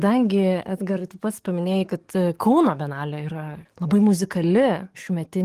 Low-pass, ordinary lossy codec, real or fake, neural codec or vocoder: 14.4 kHz; Opus, 24 kbps; fake; codec, 44.1 kHz, 7.8 kbps, DAC